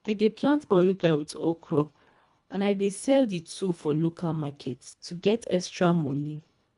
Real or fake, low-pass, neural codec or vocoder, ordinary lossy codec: fake; 10.8 kHz; codec, 24 kHz, 1.5 kbps, HILCodec; none